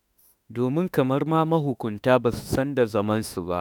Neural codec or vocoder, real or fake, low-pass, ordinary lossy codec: autoencoder, 48 kHz, 32 numbers a frame, DAC-VAE, trained on Japanese speech; fake; none; none